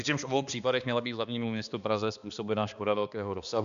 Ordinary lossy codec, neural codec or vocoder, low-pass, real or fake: MP3, 96 kbps; codec, 16 kHz, 2 kbps, X-Codec, HuBERT features, trained on balanced general audio; 7.2 kHz; fake